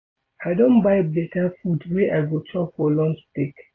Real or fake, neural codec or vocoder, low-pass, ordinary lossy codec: real; none; 7.2 kHz; AAC, 32 kbps